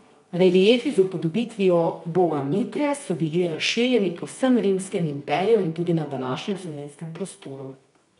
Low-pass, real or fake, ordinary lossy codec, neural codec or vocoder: 10.8 kHz; fake; none; codec, 24 kHz, 0.9 kbps, WavTokenizer, medium music audio release